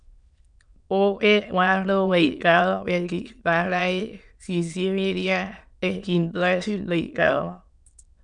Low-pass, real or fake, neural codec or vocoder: 9.9 kHz; fake; autoencoder, 22.05 kHz, a latent of 192 numbers a frame, VITS, trained on many speakers